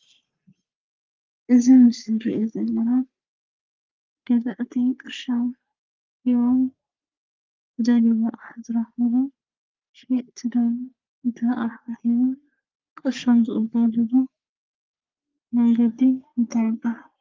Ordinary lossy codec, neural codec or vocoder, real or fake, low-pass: Opus, 32 kbps; codec, 44.1 kHz, 3.4 kbps, Pupu-Codec; fake; 7.2 kHz